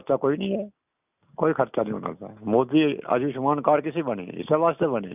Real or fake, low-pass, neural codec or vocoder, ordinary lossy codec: real; 3.6 kHz; none; none